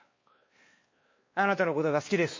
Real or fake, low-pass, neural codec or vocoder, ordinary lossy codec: fake; 7.2 kHz; codec, 16 kHz, 2 kbps, X-Codec, WavLM features, trained on Multilingual LibriSpeech; MP3, 32 kbps